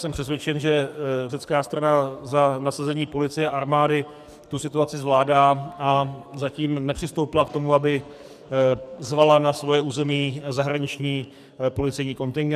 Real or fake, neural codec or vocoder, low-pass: fake; codec, 44.1 kHz, 2.6 kbps, SNAC; 14.4 kHz